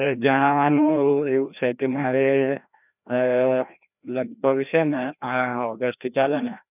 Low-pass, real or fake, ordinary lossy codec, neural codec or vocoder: 3.6 kHz; fake; none; codec, 16 kHz, 1 kbps, FreqCodec, larger model